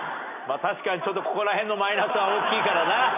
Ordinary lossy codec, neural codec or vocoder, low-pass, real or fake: none; none; 3.6 kHz; real